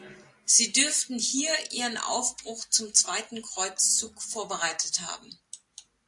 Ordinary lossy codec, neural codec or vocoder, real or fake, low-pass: AAC, 64 kbps; none; real; 10.8 kHz